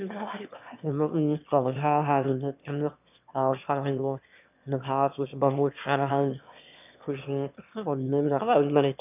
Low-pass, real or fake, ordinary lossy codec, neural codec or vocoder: 3.6 kHz; fake; none; autoencoder, 22.05 kHz, a latent of 192 numbers a frame, VITS, trained on one speaker